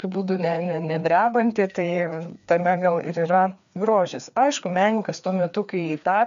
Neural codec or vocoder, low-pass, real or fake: codec, 16 kHz, 2 kbps, FreqCodec, larger model; 7.2 kHz; fake